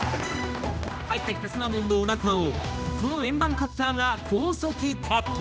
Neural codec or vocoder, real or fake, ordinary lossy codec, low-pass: codec, 16 kHz, 1 kbps, X-Codec, HuBERT features, trained on general audio; fake; none; none